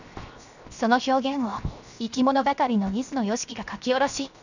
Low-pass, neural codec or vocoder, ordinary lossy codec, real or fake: 7.2 kHz; codec, 16 kHz, 0.7 kbps, FocalCodec; none; fake